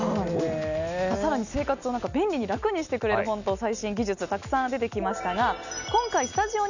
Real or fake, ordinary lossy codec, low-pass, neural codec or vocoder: real; none; 7.2 kHz; none